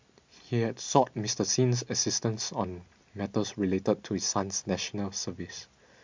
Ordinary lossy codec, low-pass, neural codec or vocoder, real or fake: MP3, 64 kbps; 7.2 kHz; none; real